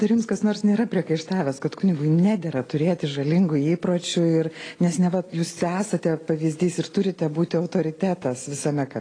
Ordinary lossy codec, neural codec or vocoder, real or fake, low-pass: AAC, 32 kbps; none; real; 9.9 kHz